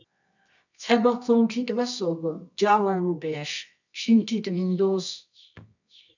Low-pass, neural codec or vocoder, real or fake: 7.2 kHz; codec, 24 kHz, 0.9 kbps, WavTokenizer, medium music audio release; fake